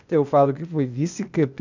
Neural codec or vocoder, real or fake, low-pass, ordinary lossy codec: codec, 16 kHz, 0.8 kbps, ZipCodec; fake; 7.2 kHz; none